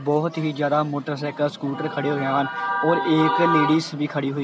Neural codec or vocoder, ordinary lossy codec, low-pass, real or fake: none; none; none; real